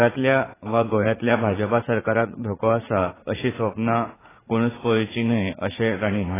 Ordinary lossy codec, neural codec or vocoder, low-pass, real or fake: AAC, 16 kbps; vocoder, 44.1 kHz, 80 mel bands, Vocos; 3.6 kHz; fake